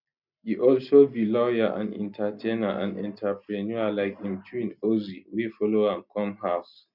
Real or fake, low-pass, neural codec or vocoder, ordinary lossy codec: real; 5.4 kHz; none; none